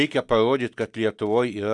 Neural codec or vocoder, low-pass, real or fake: none; 10.8 kHz; real